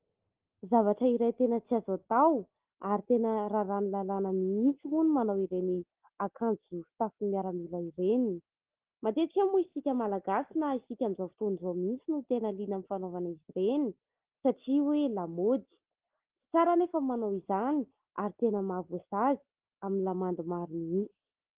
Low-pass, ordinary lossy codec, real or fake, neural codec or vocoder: 3.6 kHz; Opus, 16 kbps; real; none